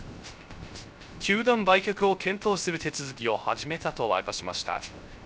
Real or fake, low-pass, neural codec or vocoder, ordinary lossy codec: fake; none; codec, 16 kHz, 0.3 kbps, FocalCodec; none